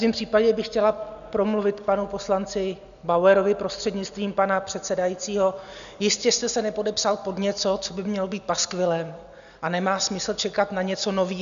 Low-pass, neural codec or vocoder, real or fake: 7.2 kHz; none; real